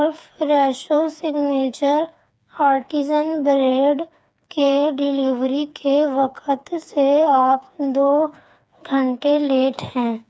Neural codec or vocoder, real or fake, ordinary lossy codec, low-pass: codec, 16 kHz, 4 kbps, FreqCodec, smaller model; fake; none; none